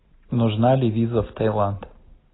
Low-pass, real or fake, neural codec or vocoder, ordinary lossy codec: 7.2 kHz; real; none; AAC, 16 kbps